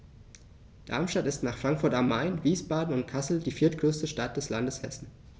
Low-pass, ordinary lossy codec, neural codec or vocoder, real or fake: none; none; none; real